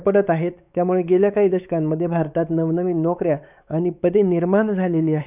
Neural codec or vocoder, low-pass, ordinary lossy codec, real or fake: codec, 16 kHz, 4 kbps, X-Codec, WavLM features, trained on Multilingual LibriSpeech; 3.6 kHz; none; fake